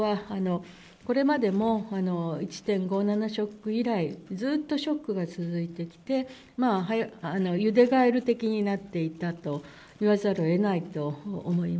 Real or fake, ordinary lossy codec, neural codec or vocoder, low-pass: real; none; none; none